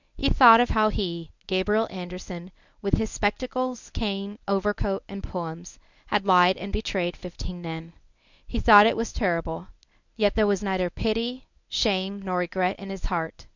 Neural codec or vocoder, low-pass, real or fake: codec, 24 kHz, 0.9 kbps, WavTokenizer, medium speech release version 1; 7.2 kHz; fake